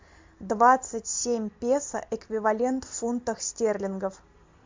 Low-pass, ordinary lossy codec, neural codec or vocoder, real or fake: 7.2 kHz; MP3, 64 kbps; none; real